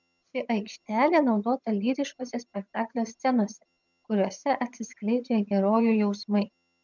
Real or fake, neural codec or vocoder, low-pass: fake; vocoder, 22.05 kHz, 80 mel bands, HiFi-GAN; 7.2 kHz